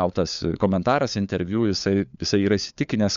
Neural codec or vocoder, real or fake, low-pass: codec, 16 kHz, 4 kbps, FunCodec, trained on LibriTTS, 50 frames a second; fake; 7.2 kHz